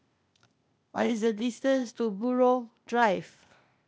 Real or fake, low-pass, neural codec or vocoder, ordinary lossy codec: fake; none; codec, 16 kHz, 0.8 kbps, ZipCodec; none